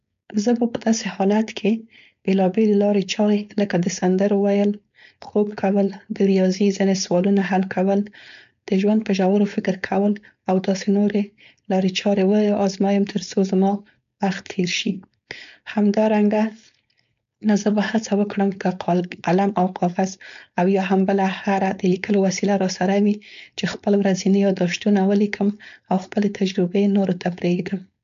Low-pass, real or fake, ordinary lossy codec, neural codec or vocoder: 7.2 kHz; fake; MP3, 64 kbps; codec, 16 kHz, 4.8 kbps, FACodec